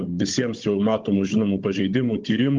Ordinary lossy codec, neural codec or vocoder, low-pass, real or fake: Opus, 32 kbps; codec, 16 kHz, 16 kbps, FunCodec, trained on Chinese and English, 50 frames a second; 7.2 kHz; fake